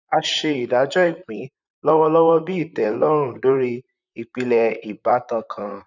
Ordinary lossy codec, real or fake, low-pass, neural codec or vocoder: none; fake; 7.2 kHz; vocoder, 44.1 kHz, 128 mel bands, Pupu-Vocoder